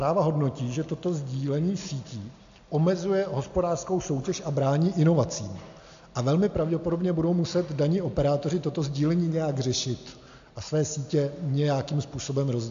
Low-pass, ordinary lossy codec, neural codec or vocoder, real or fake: 7.2 kHz; AAC, 64 kbps; none; real